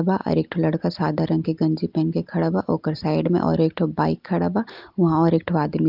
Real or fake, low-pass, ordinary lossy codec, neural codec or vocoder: real; 5.4 kHz; Opus, 32 kbps; none